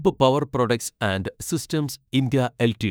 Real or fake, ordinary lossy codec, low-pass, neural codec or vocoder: fake; none; none; autoencoder, 48 kHz, 32 numbers a frame, DAC-VAE, trained on Japanese speech